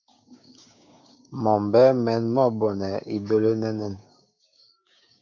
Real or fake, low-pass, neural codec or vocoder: fake; 7.2 kHz; codec, 16 kHz, 6 kbps, DAC